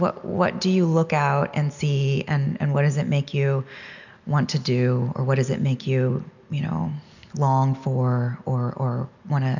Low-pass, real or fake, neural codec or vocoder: 7.2 kHz; real; none